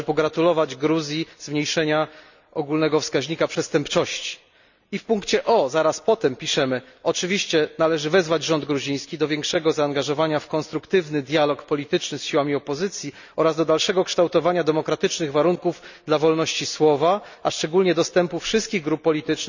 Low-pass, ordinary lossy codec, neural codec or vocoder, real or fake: 7.2 kHz; none; none; real